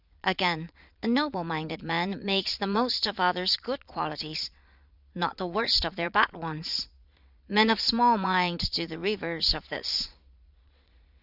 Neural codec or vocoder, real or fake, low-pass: vocoder, 22.05 kHz, 80 mel bands, Vocos; fake; 5.4 kHz